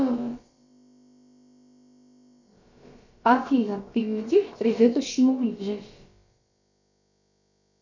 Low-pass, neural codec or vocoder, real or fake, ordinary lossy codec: 7.2 kHz; codec, 16 kHz, about 1 kbps, DyCAST, with the encoder's durations; fake; Opus, 64 kbps